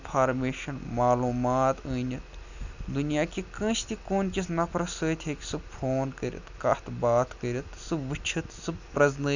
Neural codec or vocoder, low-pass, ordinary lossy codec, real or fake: none; 7.2 kHz; none; real